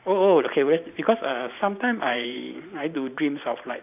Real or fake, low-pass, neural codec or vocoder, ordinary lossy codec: fake; 3.6 kHz; vocoder, 44.1 kHz, 128 mel bands every 512 samples, BigVGAN v2; none